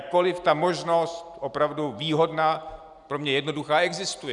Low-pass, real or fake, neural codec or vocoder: 10.8 kHz; real; none